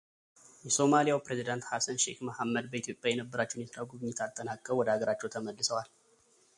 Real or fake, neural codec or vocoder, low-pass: real; none; 10.8 kHz